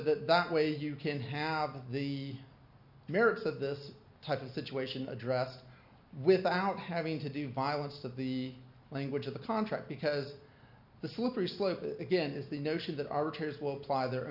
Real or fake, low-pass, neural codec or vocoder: real; 5.4 kHz; none